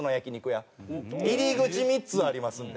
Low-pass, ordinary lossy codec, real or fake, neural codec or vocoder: none; none; real; none